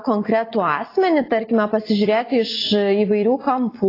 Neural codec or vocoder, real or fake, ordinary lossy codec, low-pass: none; real; AAC, 24 kbps; 5.4 kHz